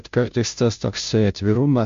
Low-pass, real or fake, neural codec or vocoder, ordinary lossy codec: 7.2 kHz; fake; codec, 16 kHz, 0.5 kbps, FunCodec, trained on Chinese and English, 25 frames a second; MP3, 48 kbps